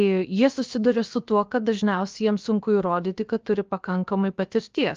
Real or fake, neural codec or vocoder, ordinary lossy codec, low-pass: fake; codec, 16 kHz, about 1 kbps, DyCAST, with the encoder's durations; Opus, 24 kbps; 7.2 kHz